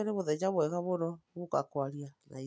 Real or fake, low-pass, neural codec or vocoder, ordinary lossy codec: real; none; none; none